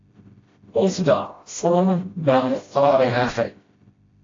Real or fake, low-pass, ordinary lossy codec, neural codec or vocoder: fake; 7.2 kHz; AAC, 32 kbps; codec, 16 kHz, 0.5 kbps, FreqCodec, smaller model